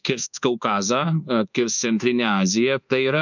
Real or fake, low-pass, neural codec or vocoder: fake; 7.2 kHz; codec, 24 kHz, 1.2 kbps, DualCodec